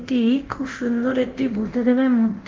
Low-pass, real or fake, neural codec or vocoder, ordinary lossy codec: 7.2 kHz; fake; codec, 24 kHz, 0.9 kbps, DualCodec; Opus, 16 kbps